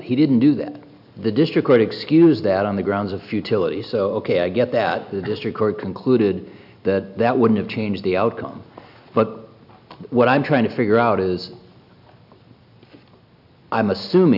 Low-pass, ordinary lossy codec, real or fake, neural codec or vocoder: 5.4 kHz; AAC, 48 kbps; real; none